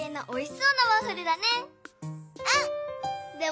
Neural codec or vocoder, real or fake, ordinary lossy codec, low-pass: none; real; none; none